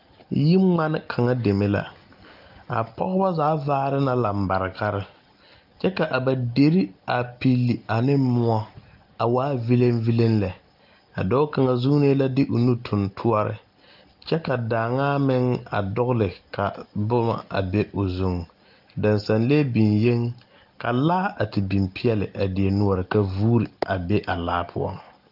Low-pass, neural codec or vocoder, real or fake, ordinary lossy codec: 5.4 kHz; none; real; Opus, 32 kbps